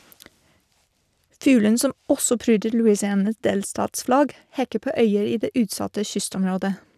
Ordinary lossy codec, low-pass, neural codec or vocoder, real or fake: none; 14.4 kHz; vocoder, 44.1 kHz, 128 mel bands every 256 samples, BigVGAN v2; fake